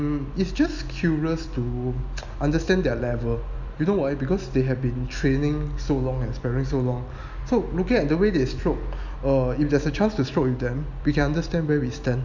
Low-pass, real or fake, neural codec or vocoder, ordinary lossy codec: 7.2 kHz; real; none; none